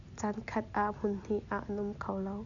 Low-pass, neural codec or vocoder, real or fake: 7.2 kHz; none; real